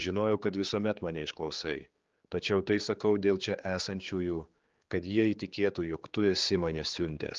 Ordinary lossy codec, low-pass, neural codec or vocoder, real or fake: Opus, 32 kbps; 7.2 kHz; codec, 16 kHz, 4 kbps, X-Codec, HuBERT features, trained on general audio; fake